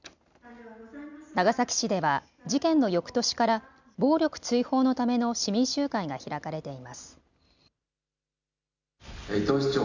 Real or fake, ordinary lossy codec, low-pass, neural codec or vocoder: real; none; 7.2 kHz; none